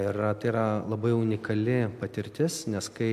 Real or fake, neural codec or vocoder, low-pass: real; none; 14.4 kHz